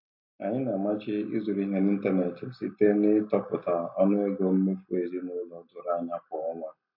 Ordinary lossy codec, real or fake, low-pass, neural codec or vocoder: MP3, 32 kbps; real; 5.4 kHz; none